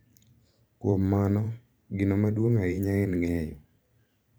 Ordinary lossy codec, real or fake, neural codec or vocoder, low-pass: none; fake; vocoder, 44.1 kHz, 128 mel bands every 512 samples, BigVGAN v2; none